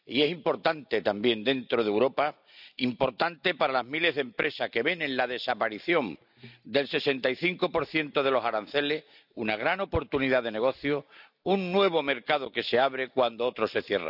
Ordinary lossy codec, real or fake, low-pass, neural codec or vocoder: none; real; 5.4 kHz; none